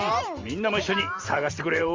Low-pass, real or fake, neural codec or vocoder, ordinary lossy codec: 7.2 kHz; fake; vocoder, 44.1 kHz, 128 mel bands every 512 samples, BigVGAN v2; Opus, 32 kbps